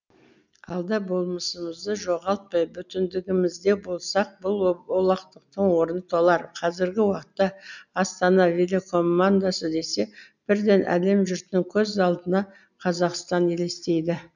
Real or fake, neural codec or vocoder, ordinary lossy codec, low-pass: real; none; none; 7.2 kHz